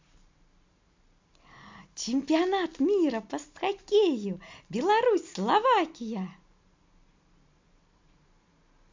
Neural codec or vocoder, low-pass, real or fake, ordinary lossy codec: none; 7.2 kHz; real; MP3, 48 kbps